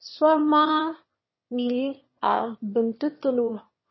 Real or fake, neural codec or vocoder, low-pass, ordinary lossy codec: fake; autoencoder, 22.05 kHz, a latent of 192 numbers a frame, VITS, trained on one speaker; 7.2 kHz; MP3, 24 kbps